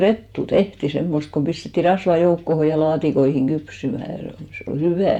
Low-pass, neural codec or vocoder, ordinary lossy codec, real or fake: 19.8 kHz; vocoder, 48 kHz, 128 mel bands, Vocos; none; fake